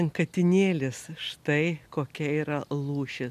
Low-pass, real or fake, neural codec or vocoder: 14.4 kHz; real; none